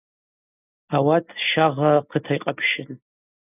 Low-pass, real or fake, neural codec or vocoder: 3.6 kHz; real; none